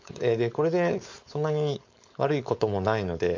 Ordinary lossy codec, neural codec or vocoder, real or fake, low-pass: MP3, 48 kbps; codec, 16 kHz, 4.8 kbps, FACodec; fake; 7.2 kHz